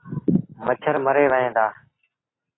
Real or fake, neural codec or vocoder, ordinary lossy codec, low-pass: real; none; AAC, 16 kbps; 7.2 kHz